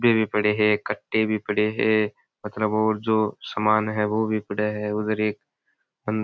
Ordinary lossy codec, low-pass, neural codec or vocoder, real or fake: none; none; none; real